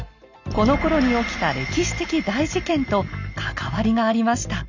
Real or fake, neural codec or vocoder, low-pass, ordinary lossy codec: real; none; 7.2 kHz; none